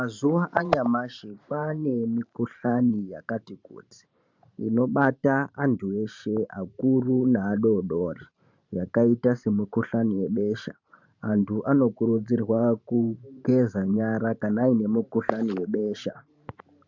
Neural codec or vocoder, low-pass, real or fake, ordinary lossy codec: vocoder, 44.1 kHz, 128 mel bands every 512 samples, BigVGAN v2; 7.2 kHz; fake; MP3, 64 kbps